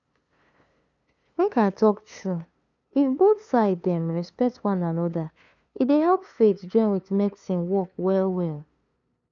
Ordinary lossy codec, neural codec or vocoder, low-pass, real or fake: none; codec, 16 kHz, 2 kbps, FunCodec, trained on LibriTTS, 25 frames a second; 7.2 kHz; fake